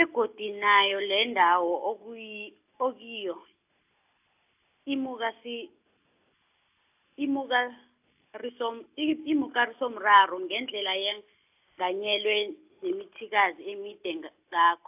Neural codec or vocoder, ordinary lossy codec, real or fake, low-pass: none; AAC, 32 kbps; real; 3.6 kHz